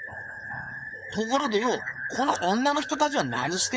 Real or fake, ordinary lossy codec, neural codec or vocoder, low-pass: fake; none; codec, 16 kHz, 4.8 kbps, FACodec; none